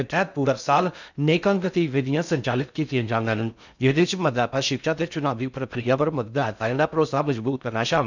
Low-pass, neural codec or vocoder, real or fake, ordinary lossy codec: 7.2 kHz; codec, 16 kHz in and 24 kHz out, 0.6 kbps, FocalCodec, streaming, 2048 codes; fake; none